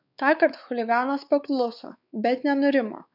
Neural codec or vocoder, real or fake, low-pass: codec, 16 kHz, 4 kbps, X-Codec, WavLM features, trained on Multilingual LibriSpeech; fake; 5.4 kHz